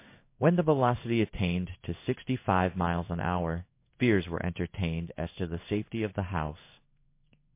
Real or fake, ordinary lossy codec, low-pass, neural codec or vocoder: fake; MP3, 24 kbps; 3.6 kHz; codec, 16 kHz in and 24 kHz out, 1 kbps, XY-Tokenizer